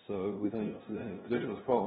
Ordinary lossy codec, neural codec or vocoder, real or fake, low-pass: AAC, 16 kbps; codec, 16 kHz, 0.5 kbps, FunCodec, trained on LibriTTS, 25 frames a second; fake; 7.2 kHz